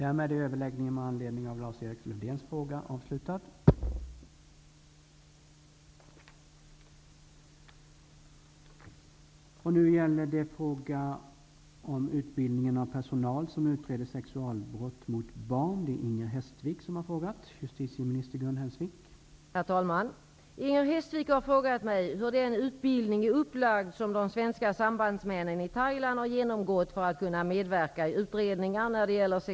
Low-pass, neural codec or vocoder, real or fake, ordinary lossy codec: none; none; real; none